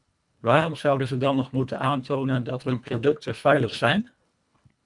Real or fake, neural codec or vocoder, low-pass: fake; codec, 24 kHz, 1.5 kbps, HILCodec; 10.8 kHz